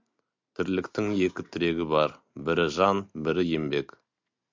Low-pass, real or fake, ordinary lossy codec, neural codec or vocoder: 7.2 kHz; real; MP3, 64 kbps; none